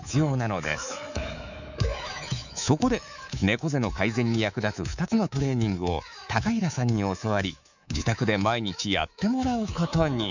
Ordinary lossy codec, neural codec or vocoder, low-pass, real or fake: none; codec, 24 kHz, 3.1 kbps, DualCodec; 7.2 kHz; fake